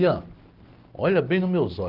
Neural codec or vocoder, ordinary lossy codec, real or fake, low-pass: none; Opus, 16 kbps; real; 5.4 kHz